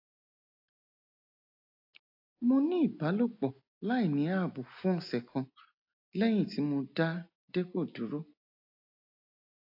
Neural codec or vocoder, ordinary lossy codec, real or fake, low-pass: none; AAC, 32 kbps; real; 5.4 kHz